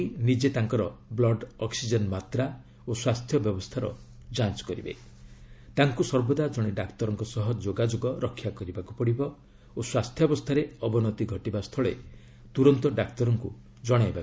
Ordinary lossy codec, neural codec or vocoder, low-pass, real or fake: none; none; none; real